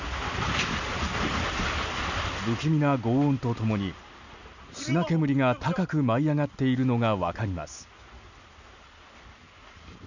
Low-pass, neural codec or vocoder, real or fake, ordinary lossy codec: 7.2 kHz; none; real; none